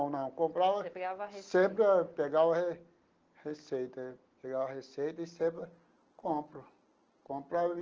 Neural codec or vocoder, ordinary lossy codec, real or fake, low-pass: none; Opus, 16 kbps; real; 7.2 kHz